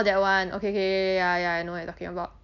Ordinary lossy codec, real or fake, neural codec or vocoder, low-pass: MP3, 64 kbps; real; none; 7.2 kHz